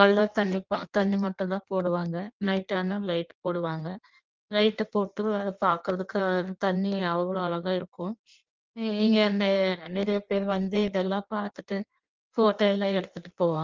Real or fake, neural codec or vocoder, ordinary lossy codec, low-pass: fake; codec, 16 kHz in and 24 kHz out, 1.1 kbps, FireRedTTS-2 codec; Opus, 24 kbps; 7.2 kHz